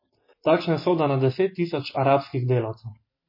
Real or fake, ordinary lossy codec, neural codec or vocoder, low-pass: real; MP3, 24 kbps; none; 5.4 kHz